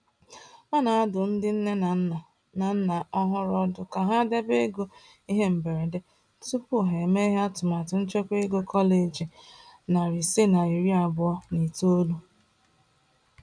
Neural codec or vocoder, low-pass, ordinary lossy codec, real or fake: none; 9.9 kHz; none; real